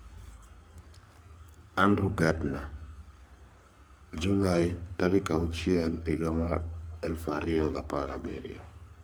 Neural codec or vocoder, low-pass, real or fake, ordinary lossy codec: codec, 44.1 kHz, 3.4 kbps, Pupu-Codec; none; fake; none